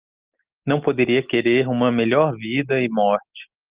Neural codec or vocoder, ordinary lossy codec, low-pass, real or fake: none; Opus, 32 kbps; 3.6 kHz; real